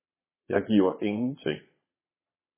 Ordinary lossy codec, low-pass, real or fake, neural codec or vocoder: MP3, 16 kbps; 3.6 kHz; fake; vocoder, 22.05 kHz, 80 mel bands, Vocos